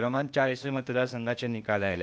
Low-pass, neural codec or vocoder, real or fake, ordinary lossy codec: none; codec, 16 kHz, 0.8 kbps, ZipCodec; fake; none